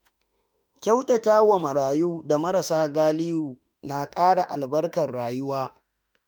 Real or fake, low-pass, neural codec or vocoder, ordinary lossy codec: fake; none; autoencoder, 48 kHz, 32 numbers a frame, DAC-VAE, trained on Japanese speech; none